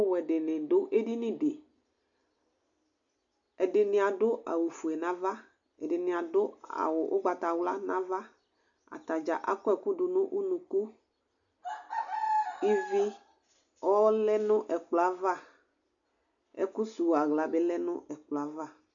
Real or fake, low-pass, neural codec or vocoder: real; 7.2 kHz; none